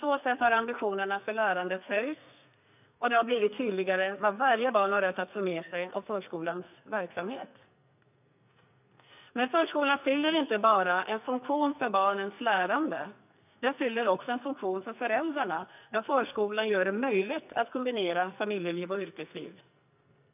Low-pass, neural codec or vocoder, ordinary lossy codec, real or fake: 3.6 kHz; codec, 44.1 kHz, 2.6 kbps, SNAC; none; fake